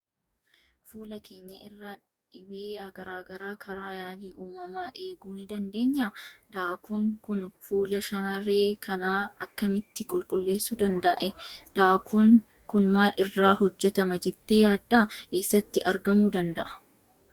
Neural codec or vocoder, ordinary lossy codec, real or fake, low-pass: codec, 44.1 kHz, 2.6 kbps, DAC; Opus, 64 kbps; fake; 19.8 kHz